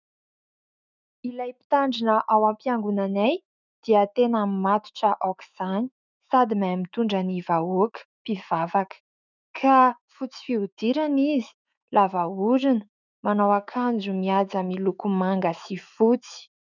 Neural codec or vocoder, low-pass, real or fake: autoencoder, 48 kHz, 128 numbers a frame, DAC-VAE, trained on Japanese speech; 7.2 kHz; fake